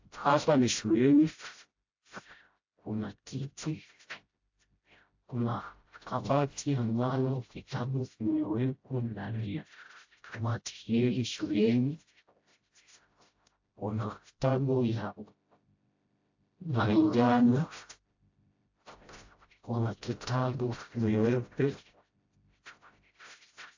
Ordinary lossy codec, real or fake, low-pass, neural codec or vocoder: AAC, 48 kbps; fake; 7.2 kHz; codec, 16 kHz, 0.5 kbps, FreqCodec, smaller model